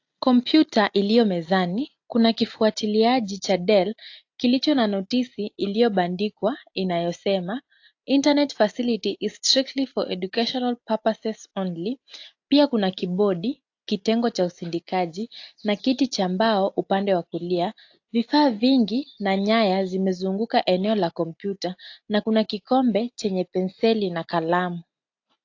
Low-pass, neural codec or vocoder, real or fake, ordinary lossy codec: 7.2 kHz; none; real; AAC, 48 kbps